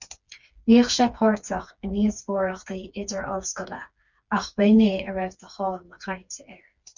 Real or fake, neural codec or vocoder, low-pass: fake; codec, 16 kHz, 4 kbps, FreqCodec, smaller model; 7.2 kHz